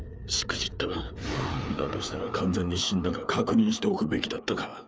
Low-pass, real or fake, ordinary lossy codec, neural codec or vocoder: none; fake; none; codec, 16 kHz, 4 kbps, FreqCodec, larger model